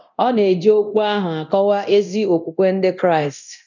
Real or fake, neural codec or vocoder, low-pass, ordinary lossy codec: fake; codec, 24 kHz, 0.9 kbps, DualCodec; 7.2 kHz; none